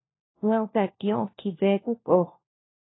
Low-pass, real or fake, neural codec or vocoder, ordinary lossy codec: 7.2 kHz; fake; codec, 16 kHz, 1 kbps, FunCodec, trained on LibriTTS, 50 frames a second; AAC, 16 kbps